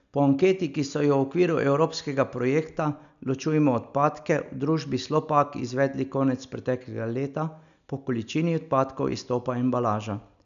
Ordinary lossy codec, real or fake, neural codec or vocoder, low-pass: none; real; none; 7.2 kHz